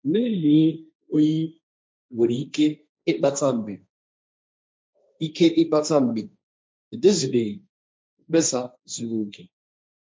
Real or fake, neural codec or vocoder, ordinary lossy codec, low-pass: fake; codec, 16 kHz, 1.1 kbps, Voila-Tokenizer; none; none